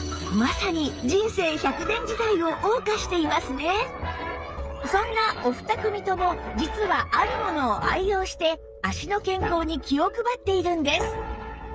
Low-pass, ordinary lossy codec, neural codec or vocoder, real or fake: none; none; codec, 16 kHz, 8 kbps, FreqCodec, smaller model; fake